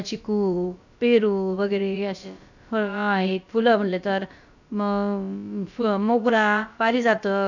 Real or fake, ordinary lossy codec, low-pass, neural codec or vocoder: fake; none; 7.2 kHz; codec, 16 kHz, about 1 kbps, DyCAST, with the encoder's durations